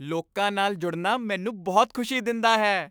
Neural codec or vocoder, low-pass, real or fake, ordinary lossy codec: autoencoder, 48 kHz, 128 numbers a frame, DAC-VAE, trained on Japanese speech; none; fake; none